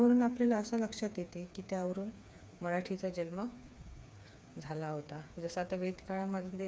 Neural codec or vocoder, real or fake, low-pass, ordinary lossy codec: codec, 16 kHz, 4 kbps, FreqCodec, smaller model; fake; none; none